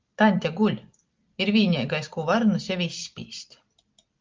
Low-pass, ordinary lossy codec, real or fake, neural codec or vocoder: 7.2 kHz; Opus, 32 kbps; real; none